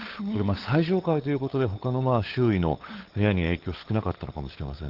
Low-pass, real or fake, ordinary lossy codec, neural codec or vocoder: 5.4 kHz; fake; Opus, 16 kbps; codec, 16 kHz, 8 kbps, FunCodec, trained on LibriTTS, 25 frames a second